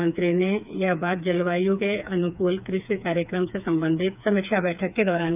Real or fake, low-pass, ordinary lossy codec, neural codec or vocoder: fake; 3.6 kHz; none; codec, 16 kHz, 4 kbps, FreqCodec, smaller model